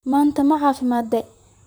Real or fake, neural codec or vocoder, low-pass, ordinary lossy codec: fake; vocoder, 44.1 kHz, 128 mel bands, Pupu-Vocoder; none; none